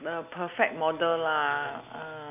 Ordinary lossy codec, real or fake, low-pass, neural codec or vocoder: none; real; 3.6 kHz; none